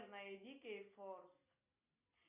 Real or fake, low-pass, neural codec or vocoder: real; 3.6 kHz; none